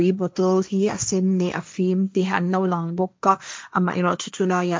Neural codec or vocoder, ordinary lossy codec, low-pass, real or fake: codec, 16 kHz, 1.1 kbps, Voila-Tokenizer; none; none; fake